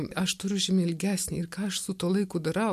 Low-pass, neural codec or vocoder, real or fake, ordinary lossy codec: 14.4 kHz; none; real; MP3, 96 kbps